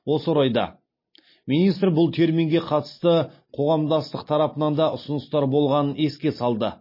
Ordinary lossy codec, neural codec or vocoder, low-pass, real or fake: MP3, 24 kbps; none; 5.4 kHz; real